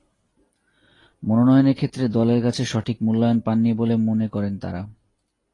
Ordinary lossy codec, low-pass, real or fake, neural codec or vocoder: AAC, 32 kbps; 10.8 kHz; real; none